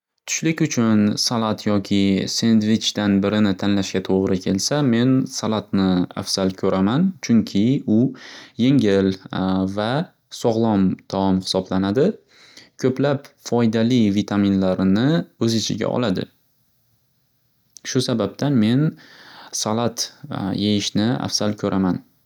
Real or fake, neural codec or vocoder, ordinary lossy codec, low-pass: real; none; none; 19.8 kHz